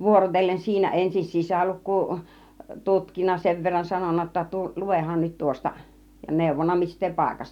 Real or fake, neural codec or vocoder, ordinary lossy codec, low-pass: real; none; none; 19.8 kHz